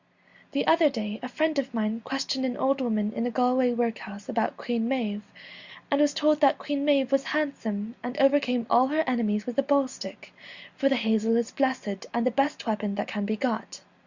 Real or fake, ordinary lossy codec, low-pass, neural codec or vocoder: real; Opus, 64 kbps; 7.2 kHz; none